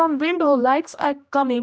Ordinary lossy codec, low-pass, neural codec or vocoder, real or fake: none; none; codec, 16 kHz, 1 kbps, X-Codec, HuBERT features, trained on general audio; fake